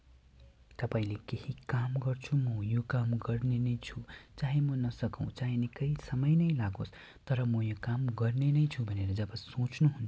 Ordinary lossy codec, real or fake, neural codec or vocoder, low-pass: none; real; none; none